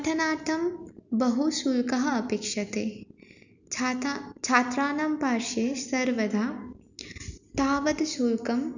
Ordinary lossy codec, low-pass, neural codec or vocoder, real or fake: none; 7.2 kHz; none; real